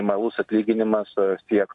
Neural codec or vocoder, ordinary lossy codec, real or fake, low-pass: none; AAC, 64 kbps; real; 10.8 kHz